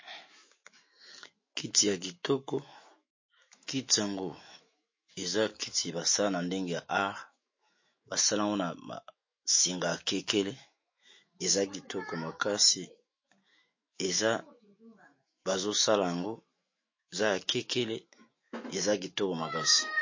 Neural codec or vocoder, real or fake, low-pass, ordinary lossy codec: autoencoder, 48 kHz, 128 numbers a frame, DAC-VAE, trained on Japanese speech; fake; 7.2 kHz; MP3, 32 kbps